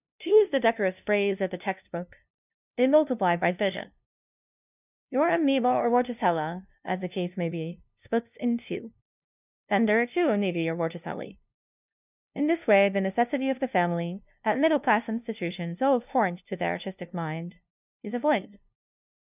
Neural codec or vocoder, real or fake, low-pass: codec, 16 kHz, 0.5 kbps, FunCodec, trained on LibriTTS, 25 frames a second; fake; 3.6 kHz